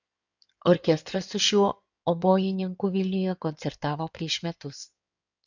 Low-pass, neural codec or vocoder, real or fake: 7.2 kHz; codec, 16 kHz in and 24 kHz out, 2.2 kbps, FireRedTTS-2 codec; fake